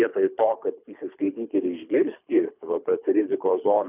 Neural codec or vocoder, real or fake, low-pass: codec, 24 kHz, 3 kbps, HILCodec; fake; 3.6 kHz